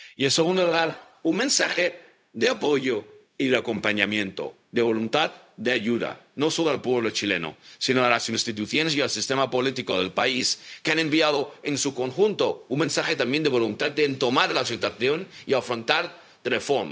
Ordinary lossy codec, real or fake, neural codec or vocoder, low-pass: none; fake; codec, 16 kHz, 0.4 kbps, LongCat-Audio-Codec; none